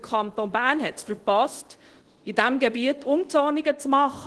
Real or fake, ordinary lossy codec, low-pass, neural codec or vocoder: fake; Opus, 16 kbps; 10.8 kHz; codec, 24 kHz, 0.5 kbps, DualCodec